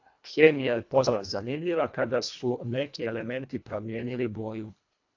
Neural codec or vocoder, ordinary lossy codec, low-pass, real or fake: codec, 24 kHz, 1.5 kbps, HILCodec; AAC, 48 kbps; 7.2 kHz; fake